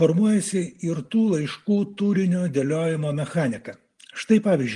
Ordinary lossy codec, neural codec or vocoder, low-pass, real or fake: Opus, 24 kbps; none; 10.8 kHz; real